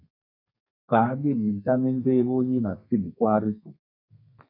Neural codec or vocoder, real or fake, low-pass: codec, 32 kHz, 1.9 kbps, SNAC; fake; 5.4 kHz